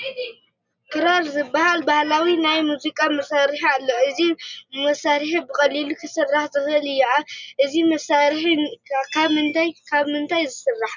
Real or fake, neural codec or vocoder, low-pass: real; none; 7.2 kHz